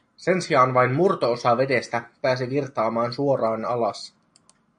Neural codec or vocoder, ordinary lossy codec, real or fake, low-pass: none; AAC, 64 kbps; real; 9.9 kHz